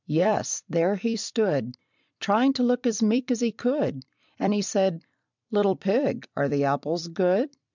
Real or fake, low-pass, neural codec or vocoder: fake; 7.2 kHz; vocoder, 44.1 kHz, 128 mel bands every 256 samples, BigVGAN v2